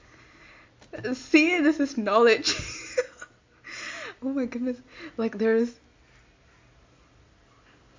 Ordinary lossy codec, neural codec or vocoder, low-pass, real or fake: MP3, 48 kbps; none; 7.2 kHz; real